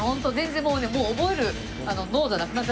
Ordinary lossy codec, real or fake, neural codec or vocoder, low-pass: none; real; none; none